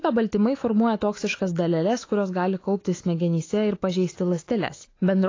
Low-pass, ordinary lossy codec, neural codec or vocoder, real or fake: 7.2 kHz; AAC, 32 kbps; autoencoder, 48 kHz, 128 numbers a frame, DAC-VAE, trained on Japanese speech; fake